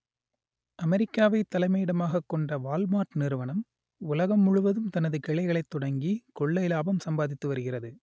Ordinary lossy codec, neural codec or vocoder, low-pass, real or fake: none; none; none; real